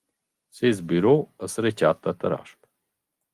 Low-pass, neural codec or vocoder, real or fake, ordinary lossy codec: 14.4 kHz; none; real; Opus, 32 kbps